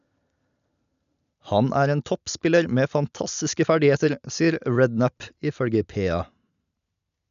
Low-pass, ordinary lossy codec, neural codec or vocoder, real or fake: 7.2 kHz; none; none; real